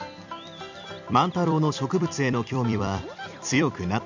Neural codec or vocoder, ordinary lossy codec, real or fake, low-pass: vocoder, 44.1 kHz, 128 mel bands every 256 samples, BigVGAN v2; none; fake; 7.2 kHz